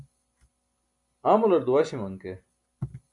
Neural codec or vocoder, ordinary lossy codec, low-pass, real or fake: none; MP3, 96 kbps; 10.8 kHz; real